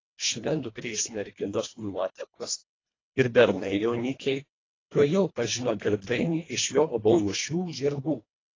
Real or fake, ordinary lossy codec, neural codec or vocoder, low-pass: fake; AAC, 32 kbps; codec, 24 kHz, 1.5 kbps, HILCodec; 7.2 kHz